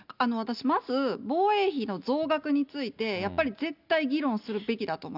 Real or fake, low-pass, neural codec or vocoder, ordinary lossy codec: real; 5.4 kHz; none; none